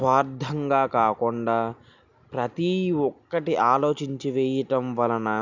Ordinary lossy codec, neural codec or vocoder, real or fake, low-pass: none; none; real; 7.2 kHz